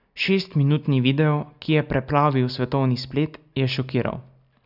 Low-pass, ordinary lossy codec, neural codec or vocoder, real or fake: 5.4 kHz; none; none; real